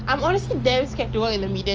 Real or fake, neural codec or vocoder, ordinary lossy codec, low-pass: real; none; Opus, 24 kbps; 7.2 kHz